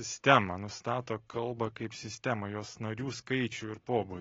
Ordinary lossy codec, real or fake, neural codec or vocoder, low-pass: AAC, 24 kbps; real; none; 7.2 kHz